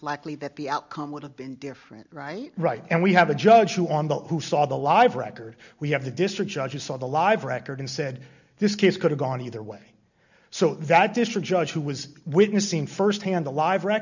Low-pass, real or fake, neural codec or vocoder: 7.2 kHz; real; none